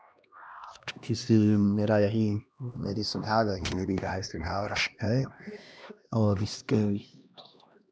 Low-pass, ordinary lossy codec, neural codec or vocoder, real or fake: none; none; codec, 16 kHz, 1 kbps, X-Codec, HuBERT features, trained on LibriSpeech; fake